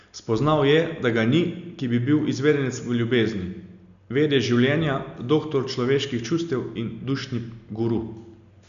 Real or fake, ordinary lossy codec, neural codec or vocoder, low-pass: real; none; none; 7.2 kHz